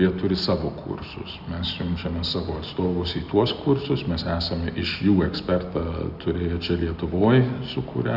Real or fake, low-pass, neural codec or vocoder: real; 5.4 kHz; none